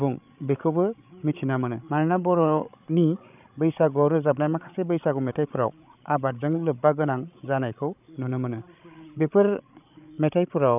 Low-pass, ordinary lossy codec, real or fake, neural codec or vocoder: 3.6 kHz; none; fake; codec, 16 kHz, 16 kbps, FreqCodec, larger model